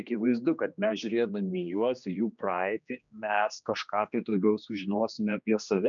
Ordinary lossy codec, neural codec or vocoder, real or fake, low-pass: MP3, 96 kbps; codec, 16 kHz, 2 kbps, X-Codec, HuBERT features, trained on general audio; fake; 7.2 kHz